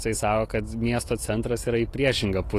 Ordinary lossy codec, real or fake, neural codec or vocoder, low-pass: AAC, 48 kbps; real; none; 14.4 kHz